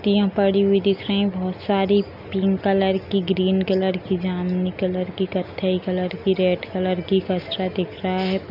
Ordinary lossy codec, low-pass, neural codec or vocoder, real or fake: none; 5.4 kHz; none; real